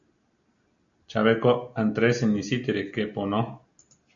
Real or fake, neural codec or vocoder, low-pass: real; none; 7.2 kHz